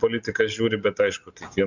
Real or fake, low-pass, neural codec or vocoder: real; 7.2 kHz; none